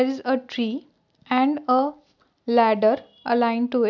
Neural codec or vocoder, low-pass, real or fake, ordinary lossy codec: none; 7.2 kHz; real; none